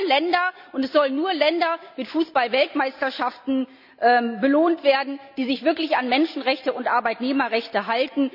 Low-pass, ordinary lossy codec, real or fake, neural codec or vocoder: 5.4 kHz; none; real; none